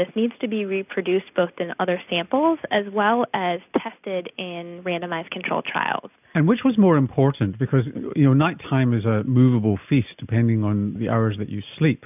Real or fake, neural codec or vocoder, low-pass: real; none; 3.6 kHz